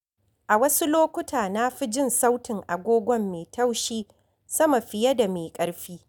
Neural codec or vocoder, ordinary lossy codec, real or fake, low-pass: none; none; real; 19.8 kHz